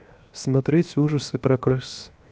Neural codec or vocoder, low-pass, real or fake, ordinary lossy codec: codec, 16 kHz, 0.7 kbps, FocalCodec; none; fake; none